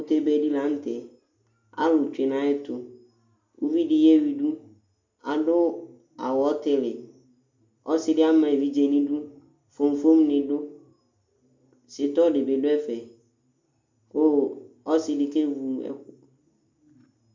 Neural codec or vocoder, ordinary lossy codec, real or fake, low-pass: none; MP3, 64 kbps; real; 7.2 kHz